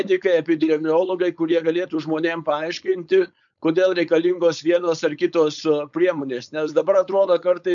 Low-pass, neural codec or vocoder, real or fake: 7.2 kHz; codec, 16 kHz, 4.8 kbps, FACodec; fake